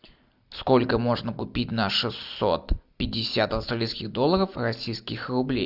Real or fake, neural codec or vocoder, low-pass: real; none; 5.4 kHz